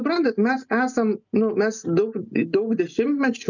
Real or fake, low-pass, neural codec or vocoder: real; 7.2 kHz; none